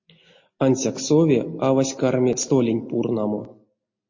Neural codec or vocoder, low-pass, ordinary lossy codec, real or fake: none; 7.2 kHz; MP3, 32 kbps; real